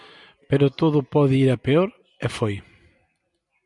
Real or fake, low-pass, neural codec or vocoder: real; 10.8 kHz; none